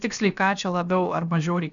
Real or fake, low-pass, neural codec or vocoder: fake; 7.2 kHz; codec, 16 kHz, about 1 kbps, DyCAST, with the encoder's durations